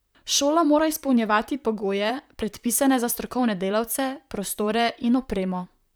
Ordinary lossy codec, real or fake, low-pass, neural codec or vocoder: none; fake; none; vocoder, 44.1 kHz, 128 mel bands, Pupu-Vocoder